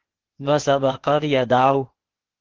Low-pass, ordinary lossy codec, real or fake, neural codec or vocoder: 7.2 kHz; Opus, 16 kbps; fake; codec, 16 kHz, 0.8 kbps, ZipCodec